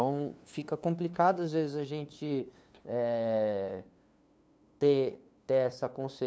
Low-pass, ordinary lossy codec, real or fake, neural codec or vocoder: none; none; fake; codec, 16 kHz, 2 kbps, FunCodec, trained on LibriTTS, 25 frames a second